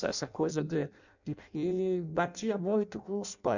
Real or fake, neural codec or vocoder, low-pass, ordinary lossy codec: fake; codec, 16 kHz in and 24 kHz out, 0.6 kbps, FireRedTTS-2 codec; 7.2 kHz; none